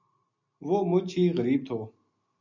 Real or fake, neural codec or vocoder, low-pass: real; none; 7.2 kHz